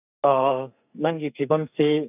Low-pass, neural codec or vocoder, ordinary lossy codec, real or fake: 3.6 kHz; codec, 44.1 kHz, 2.6 kbps, SNAC; none; fake